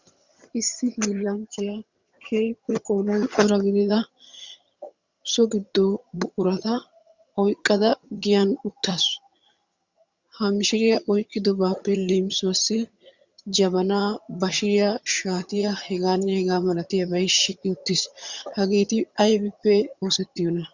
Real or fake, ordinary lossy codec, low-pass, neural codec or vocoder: fake; Opus, 64 kbps; 7.2 kHz; vocoder, 22.05 kHz, 80 mel bands, HiFi-GAN